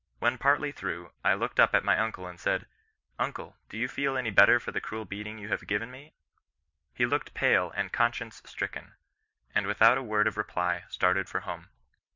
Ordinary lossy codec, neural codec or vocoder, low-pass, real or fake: MP3, 64 kbps; none; 7.2 kHz; real